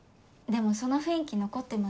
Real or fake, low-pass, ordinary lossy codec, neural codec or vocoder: real; none; none; none